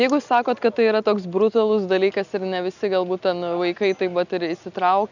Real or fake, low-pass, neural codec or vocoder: real; 7.2 kHz; none